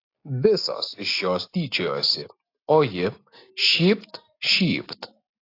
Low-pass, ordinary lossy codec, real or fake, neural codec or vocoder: 5.4 kHz; AAC, 32 kbps; real; none